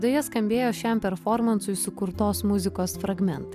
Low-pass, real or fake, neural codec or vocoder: 14.4 kHz; real; none